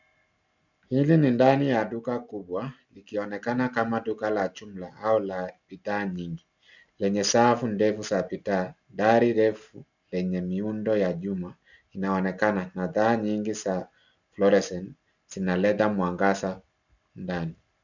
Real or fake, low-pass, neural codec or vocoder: real; 7.2 kHz; none